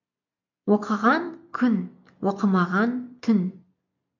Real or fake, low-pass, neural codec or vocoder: real; 7.2 kHz; none